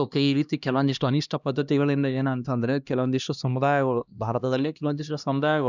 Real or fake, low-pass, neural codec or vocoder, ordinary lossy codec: fake; 7.2 kHz; codec, 16 kHz, 1 kbps, X-Codec, HuBERT features, trained on LibriSpeech; none